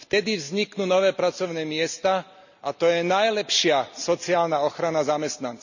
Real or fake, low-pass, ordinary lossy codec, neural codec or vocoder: real; 7.2 kHz; none; none